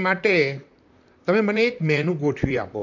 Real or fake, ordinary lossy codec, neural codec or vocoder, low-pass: fake; AAC, 48 kbps; vocoder, 44.1 kHz, 128 mel bands, Pupu-Vocoder; 7.2 kHz